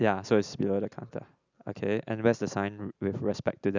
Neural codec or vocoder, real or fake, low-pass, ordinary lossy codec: none; real; 7.2 kHz; none